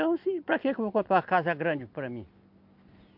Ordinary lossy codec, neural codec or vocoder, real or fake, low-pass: MP3, 48 kbps; none; real; 5.4 kHz